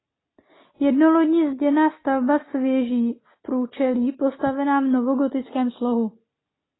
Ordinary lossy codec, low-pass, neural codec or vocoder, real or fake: AAC, 16 kbps; 7.2 kHz; none; real